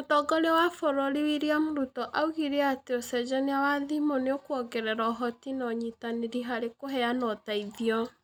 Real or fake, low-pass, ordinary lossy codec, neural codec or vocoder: real; none; none; none